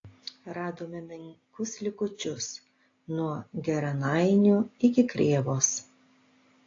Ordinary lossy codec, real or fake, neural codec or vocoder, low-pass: AAC, 32 kbps; real; none; 7.2 kHz